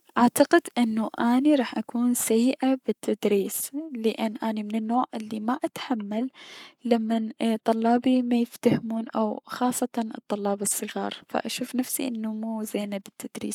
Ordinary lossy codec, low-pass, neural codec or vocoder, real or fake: none; 19.8 kHz; codec, 44.1 kHz, 7.8 kbps, Pupu-Codec; fake